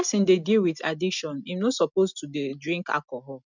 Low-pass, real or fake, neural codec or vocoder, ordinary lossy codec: 7.2 kHz; real; none; none